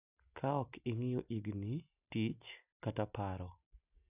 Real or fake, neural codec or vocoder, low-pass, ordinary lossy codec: real; none; 3.6 kHz; none